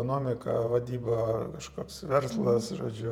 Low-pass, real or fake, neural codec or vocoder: 19.8 kHz; fake; vocoder, 48 kHz, 128 mel bands, Vocos